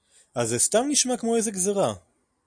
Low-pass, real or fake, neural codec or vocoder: 9.9 kHz; real; none